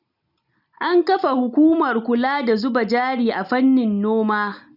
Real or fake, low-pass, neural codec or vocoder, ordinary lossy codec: real; 5.4 kHz; none; none